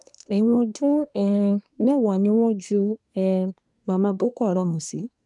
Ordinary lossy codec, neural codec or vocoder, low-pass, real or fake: none; codec, 24 kHz, 1 kbps, SNAC; 10.8 kHz; fake